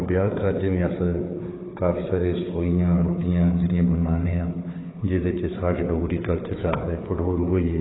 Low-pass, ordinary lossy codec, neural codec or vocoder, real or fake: 7.2 kHz; AAC, 16 kbps; codec, 16 kHz, 4 kbps, FunCodec, trained on Chinese and English, 50 frames a second; fake